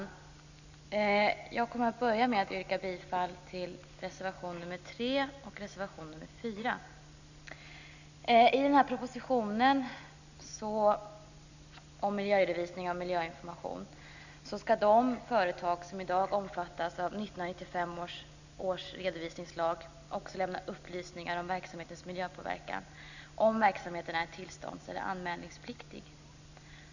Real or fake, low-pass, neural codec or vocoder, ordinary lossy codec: real; 7.2 kHz; none; none